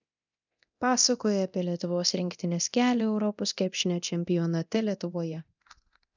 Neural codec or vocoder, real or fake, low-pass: codec, 24 kHz, 0.9 kbps, DualCodec; fake; 7.2 kHz